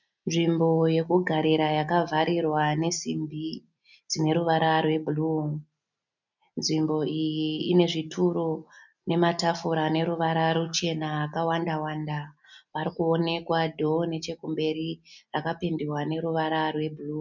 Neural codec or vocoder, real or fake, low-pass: none; real; 7.2 kHz